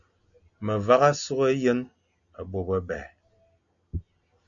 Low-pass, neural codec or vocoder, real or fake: 7.2 kHz; none; real